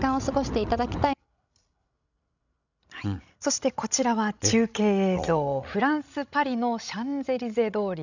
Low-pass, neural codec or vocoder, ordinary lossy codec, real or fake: 7.2 kHz; codec, 16 kHz, 16 kbps, FreqCodec, larger model; none; fake